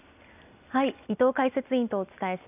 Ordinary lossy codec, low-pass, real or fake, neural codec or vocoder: none; 3.6 kHz; real; none